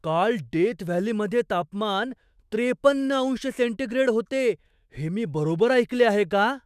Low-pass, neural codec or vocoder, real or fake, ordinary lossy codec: 14.4 kHz; autoencoder, 48 kHz, 128 numbers a frame, DAC-VAE, trained on Japanese speech; fake; none